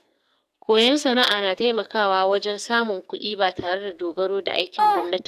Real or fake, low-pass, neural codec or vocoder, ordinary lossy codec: fake; 14.4 kHz; codec, 44.1 kHz, 2.6 kbps, SNAC; none